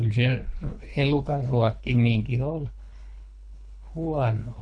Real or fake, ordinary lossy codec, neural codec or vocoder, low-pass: fake; MP3, 96 kbps; codec, 24 kHz, 3 kbps, HILCodec; 9.9 kHz